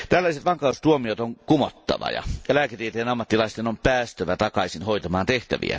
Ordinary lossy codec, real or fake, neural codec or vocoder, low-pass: none; real; none; none